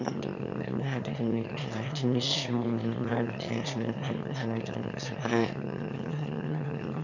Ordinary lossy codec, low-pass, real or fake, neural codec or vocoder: none; 7.2 kHz; fake; autoencoder, 22.05 kHz, a latent of 192 numbers a frame, VITS, trained on one speaker